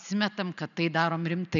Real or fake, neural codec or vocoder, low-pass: real; none; 7.2 kHz